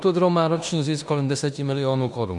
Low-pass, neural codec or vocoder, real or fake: 10.8 kHz; codec, 16 kHz in and 24 kHz out, 0.9 kbps, LongCat-Audio-Codec, fine tuned four codebook decoder; fake